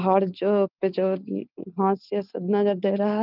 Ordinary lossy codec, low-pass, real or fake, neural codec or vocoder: Opus, 32 kbps; 5.4 kHz; real; none